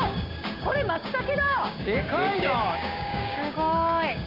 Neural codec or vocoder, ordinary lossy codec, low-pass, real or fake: codec, 16 kHz, 6 kbps, DAC; none; 5.4 kHz; fake